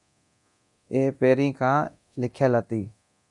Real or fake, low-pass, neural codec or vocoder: fake; 10.8 kHz; codec, 24 kHz, 0.9 kbps, DualCodec